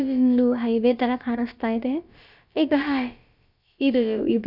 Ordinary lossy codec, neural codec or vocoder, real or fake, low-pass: none; codec, 16 kHz, about 1 kbps, DyCAST, with the encoder's durations; fake; 5.4 kHz